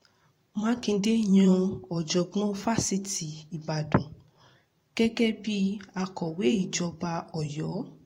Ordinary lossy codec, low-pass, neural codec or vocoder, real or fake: AAC, 48 kbps; 19.8 kHz; vocoder, 44.1 kHz, 128 mel bands every 512 samples, BigVGAN v2; fake